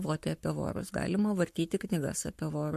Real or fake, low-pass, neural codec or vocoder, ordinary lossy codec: fake; 14.4 kHz; codec, 44.1 kHz, 7.8 kbps, Pupu-Codec; MP3, 64 kbps